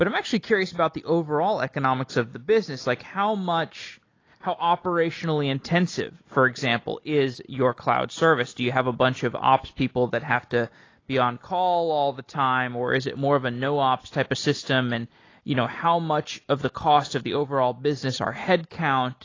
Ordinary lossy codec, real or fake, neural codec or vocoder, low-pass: AAC, 32 kbps; real; none; 7.2 kHz